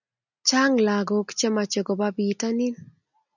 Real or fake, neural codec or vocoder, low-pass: real; none; 7.2 kHz